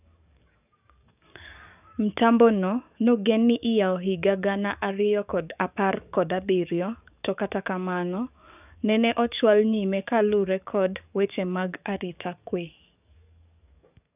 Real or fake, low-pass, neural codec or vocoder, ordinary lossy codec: fake; 3.6 kHz; codec, 44.1 kHz, 7.8 kbps, DAC; none